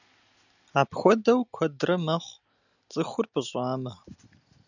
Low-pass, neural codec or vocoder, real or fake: 7.2 kHz; none; real